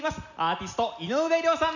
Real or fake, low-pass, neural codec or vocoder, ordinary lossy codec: real; 7.2 kHz; none; none